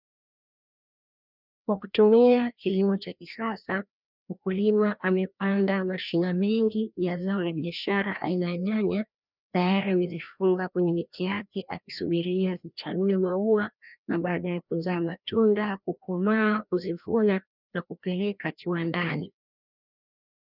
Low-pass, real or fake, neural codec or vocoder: 5.4 kHz; fake; codec, 16 kHz, 1 kbps, FreqCodec, larger model